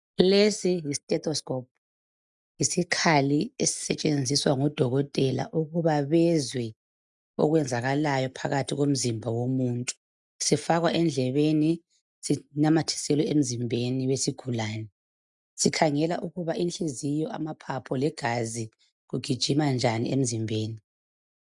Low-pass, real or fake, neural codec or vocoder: 10.8 kHz; real; none